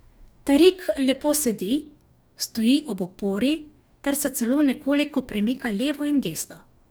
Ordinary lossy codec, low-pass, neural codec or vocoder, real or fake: none; none; codec, 44.1 kHz, 2.6 kbps, DAC; fake